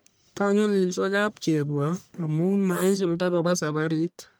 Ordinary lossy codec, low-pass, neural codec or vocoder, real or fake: none; none; codec, 44.1 kHz, 1.7 kbps, Pupu-Codec; fake